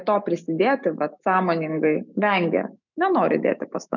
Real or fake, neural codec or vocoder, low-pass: fake; autoencoder, 48 kHz, 128 numbers a frame, DAC-VAE, trained on Japanese speech; 7.2 kHz